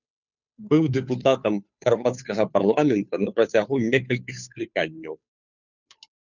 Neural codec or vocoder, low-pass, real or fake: codec, 16 kHz, 2 kbps, FunCodec, trained on Chinese and English, 25 frames a second; 7.2 kHz; fake